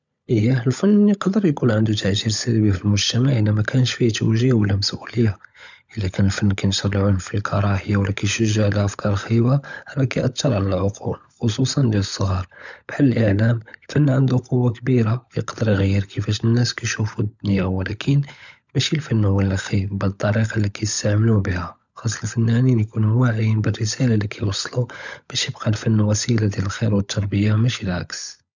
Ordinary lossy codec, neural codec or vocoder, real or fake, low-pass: none; codec, 16 kHz, 16 kbps, FunCodec, trained on LibriTTS, 50 frames a second; fake; 7.2 kHz